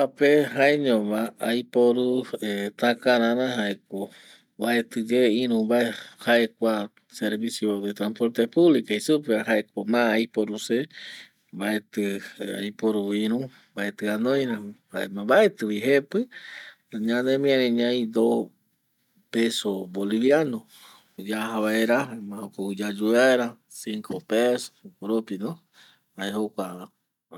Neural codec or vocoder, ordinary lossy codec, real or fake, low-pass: none; none; real; 19.8 kHz